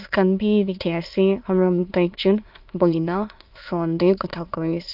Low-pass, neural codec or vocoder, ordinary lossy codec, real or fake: 5.4 kHz; autoencoder, 22.05 kHz, a latent of 192 numbers a frame, VITS, trained on many speakers; Opus, 32 kbps; fake